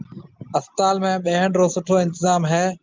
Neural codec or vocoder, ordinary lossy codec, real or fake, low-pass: none; Opus, 32 kbps; real; 7.2 kHz